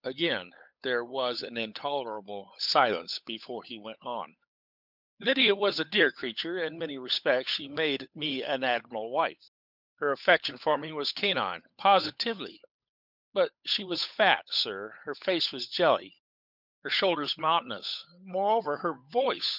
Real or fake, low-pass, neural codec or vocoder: fake; 5.4 kHz; codec, 16 kHz, 8 kbps, FunCodec, trained on Chinese and English, 25 frames a second